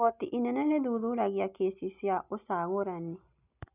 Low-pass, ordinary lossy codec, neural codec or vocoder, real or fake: 3.6 kHz; none; none; real